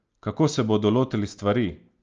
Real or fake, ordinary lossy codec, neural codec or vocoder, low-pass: real; Opus, 24 kbps; none; 7.2 kHz